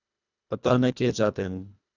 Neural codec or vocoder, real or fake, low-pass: codec, 24 kHz, 1.5 kbps, HILCodec; fake; 7.2 kHz